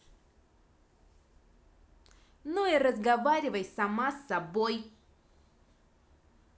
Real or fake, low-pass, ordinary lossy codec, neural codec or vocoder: real; none; none; none